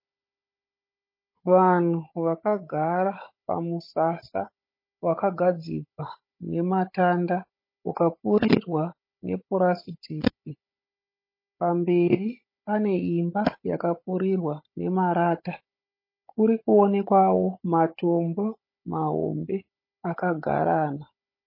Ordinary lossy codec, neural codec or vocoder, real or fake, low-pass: MP3, 24 kbps; codec, 16 kHz, 16 kbps, FunCodec, trained on Chinese and English, 50 frames a second; fake; 5.4 kHz